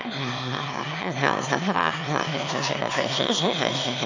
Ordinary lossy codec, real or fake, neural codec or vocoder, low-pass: AAC, 48 kbps; fake; autoencoder, 22.05 kHz, a latent of 192 numbers a frame, VITS, trained on one speaker; 7.2 kHz